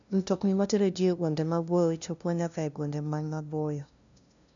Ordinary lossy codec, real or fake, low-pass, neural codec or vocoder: MP3, 96 kbps; fake; 7.2 kHz; codec, 16 kHz, 0.5 kbps, FunCodec, trained on LibriTTS, 25 frames a second